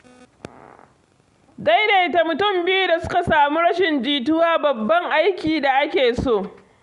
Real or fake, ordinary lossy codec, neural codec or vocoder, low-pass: real; Opus, 64 kbps; none; 10.8 kHz